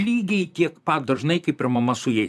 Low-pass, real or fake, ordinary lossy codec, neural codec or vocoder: 14.4 kHz; fake; MP3, 96 kbps; vocoder, 44.1 kHz, 128 mel bands, Pupu-Vocoder